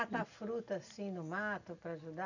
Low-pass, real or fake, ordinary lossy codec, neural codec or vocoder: 7.2 kHz; real; none; none